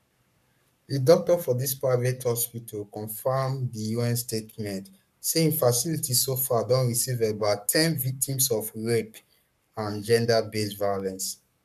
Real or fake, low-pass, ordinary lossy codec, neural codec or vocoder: fake; 14.4 kHz; none; codec, 44.1 kHz, 7.8 kbps, Pupu-Codec